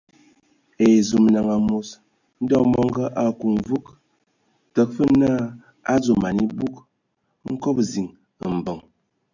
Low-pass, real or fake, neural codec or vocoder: 7.2 kHz; real; none